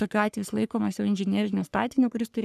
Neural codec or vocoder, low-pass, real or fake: codec, 44.1 kHz, 3.4 kbps, Pupu-Codec; 14.4 kHz; fake